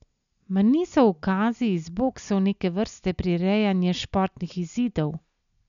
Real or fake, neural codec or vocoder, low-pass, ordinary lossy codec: real; none; 7.2 kHz; none